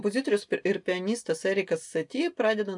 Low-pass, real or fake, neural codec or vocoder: 10.8 kHz; real; none